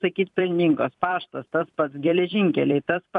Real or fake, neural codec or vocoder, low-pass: fake; vocoder, 44.1 kHz, 128 mel bands every 256 samples, BigVGAN v2; 10.8 kHz